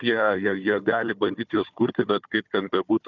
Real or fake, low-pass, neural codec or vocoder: fake; 7.2 kHz; codec, 16 kHz, 4 kbps, FunCodec, trained on Chinese and English, 50 frames a second